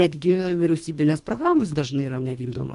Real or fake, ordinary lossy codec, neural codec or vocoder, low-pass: fake; AAC, 64 kbps; codec, 24 kHz, 1.5 kbps, HILCodec; 10.8 kHz